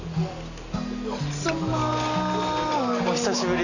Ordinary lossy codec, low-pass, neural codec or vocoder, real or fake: none; 7.2 kHz; none; real